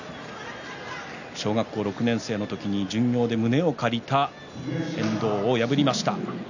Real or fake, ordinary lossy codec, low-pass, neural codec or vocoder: real; none; 7.2 kHz; none